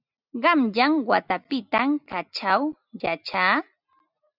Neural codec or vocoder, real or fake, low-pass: none; real; 5.4 kHz